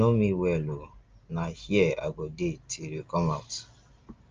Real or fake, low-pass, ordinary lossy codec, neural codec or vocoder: real; 7.2 kHz; Opus, 24 kbps; none